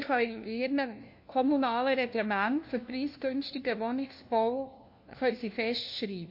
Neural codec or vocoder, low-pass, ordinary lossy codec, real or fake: codec, 16 kHz, 1 kbps, FunCodec, trained on LibriTTS, 50 frames a second; 5.4 kHz; MP3, 32 kbps; fake